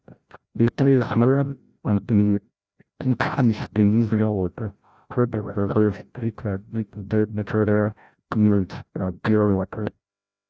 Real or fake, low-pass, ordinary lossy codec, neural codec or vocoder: fake; none; none; codec, 16 kHz, 0.5 kbps, FreqCodec, larger model